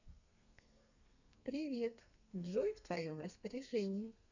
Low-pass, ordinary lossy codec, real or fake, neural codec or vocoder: 7.2 kHz; none; fake; codec, 32 kHz, 1.9 kbps, SNAC